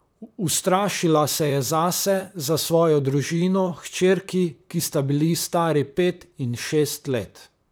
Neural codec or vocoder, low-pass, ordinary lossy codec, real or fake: vocoder, 44.1 kHz, 128 mel bands, Pupu-Vocoder; none; none; fake